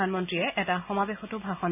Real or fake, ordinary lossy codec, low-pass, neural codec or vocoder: real; none; 3.6 kHz; none